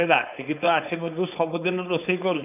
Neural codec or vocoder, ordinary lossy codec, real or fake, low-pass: codec, 16 kHz, 4.8 kbps, FACodec; none; fake; 3.6 kHz